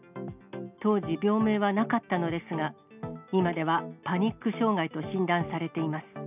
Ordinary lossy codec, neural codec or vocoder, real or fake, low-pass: none; none; real; 3.6 kHz